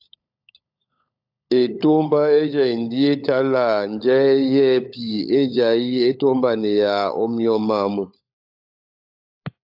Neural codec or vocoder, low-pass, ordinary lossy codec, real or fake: codec, 16 kHz, 16 kbps, FunCodec, trained on LibriTTS, 50 frames a second; 5.4 kHz; AAC, 48 kbps; fake